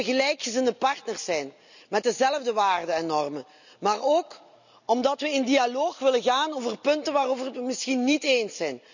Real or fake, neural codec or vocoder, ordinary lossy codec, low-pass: real; none; none; 7.2 kHz